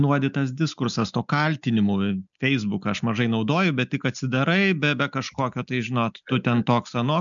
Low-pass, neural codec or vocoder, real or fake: 7.2 kHz; none; real